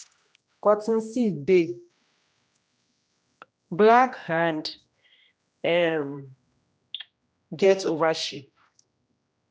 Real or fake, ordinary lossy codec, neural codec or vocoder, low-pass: fake; none; codec, 16 kHz, 1 kbps, X-Codec, HuBERT features, trained on general audio; none